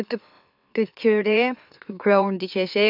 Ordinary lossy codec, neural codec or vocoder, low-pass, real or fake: none; autoencoder, 44.1 kHz, a latent of 192 numbers a frame, MeloTTS; 5.4 kHz; fake